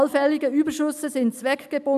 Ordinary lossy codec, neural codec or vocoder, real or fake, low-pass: none; none; real; 14.4 kHz